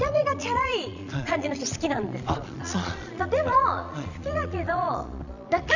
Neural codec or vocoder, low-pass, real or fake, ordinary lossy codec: vocoder, 22.05 kHz, 80 mel bands, Vocos; 7.2 kHz; fake; none